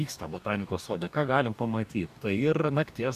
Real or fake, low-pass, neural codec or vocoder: fake; 14.4 kHz; codec, 44.1 kHz, 2.6 kbps, DAC